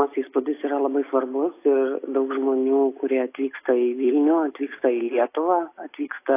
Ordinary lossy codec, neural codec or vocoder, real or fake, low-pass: AAC, 24 kbps; none; real; 3.6 kHz